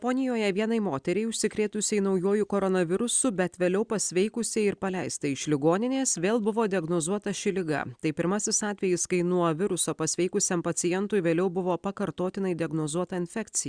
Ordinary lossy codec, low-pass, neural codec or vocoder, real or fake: Opus, 64 kbps; 9.9 kHz; none; real